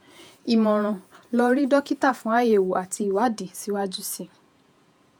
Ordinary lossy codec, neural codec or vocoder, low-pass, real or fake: none; vocoder, 48 kHz, 128 mel bands, Vocos; none; fake